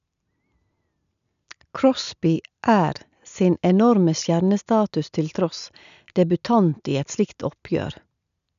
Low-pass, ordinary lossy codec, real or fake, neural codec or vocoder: 7.2 kHz; none; real; none